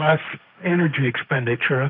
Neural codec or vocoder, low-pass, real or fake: codec, 16 kHz, 1.1 kbps, Voila-Tokenizer; 5.4 kHz; fake